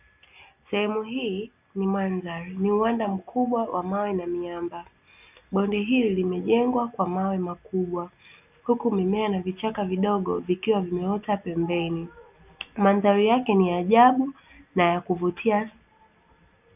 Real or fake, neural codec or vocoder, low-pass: real; none; 3.6 kHz